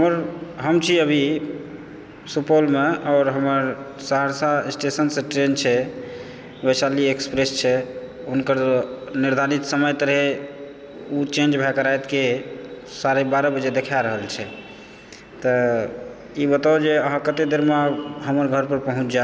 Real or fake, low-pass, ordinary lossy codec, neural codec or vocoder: real; none; none; none